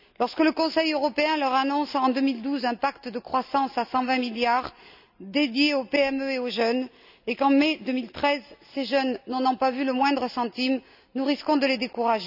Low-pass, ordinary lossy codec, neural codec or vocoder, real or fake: 5.4 kHz; none; none; real